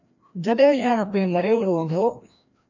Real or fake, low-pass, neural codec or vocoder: fake; 7.2 kHz; codec, 16 kHz, 1 kbps, FreqCodec, larger model